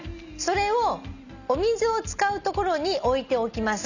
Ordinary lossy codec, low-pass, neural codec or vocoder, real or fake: none; 7.2 kHz; none; real